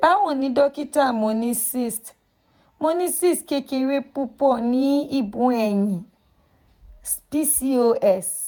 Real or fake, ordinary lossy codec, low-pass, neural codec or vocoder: fake; none; none; vocoder, 48 kHz, 128 mel bands, Vocos